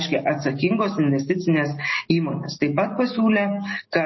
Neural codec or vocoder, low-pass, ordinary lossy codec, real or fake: none; 7.2 kHz; MP3, 24 kbps; real